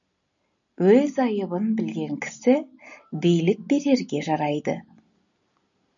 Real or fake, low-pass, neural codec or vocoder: real; 7.2 kHz; none